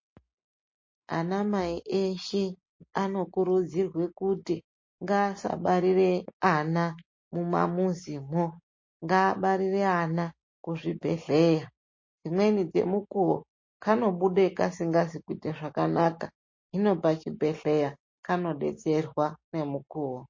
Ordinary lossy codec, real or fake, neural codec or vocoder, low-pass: MP3, 32 kbps; real; none; 7.2 kHz